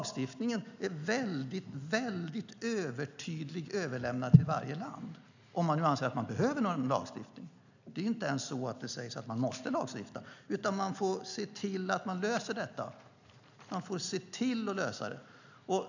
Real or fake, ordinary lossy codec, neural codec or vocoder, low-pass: real; none; none; 7.2 kHz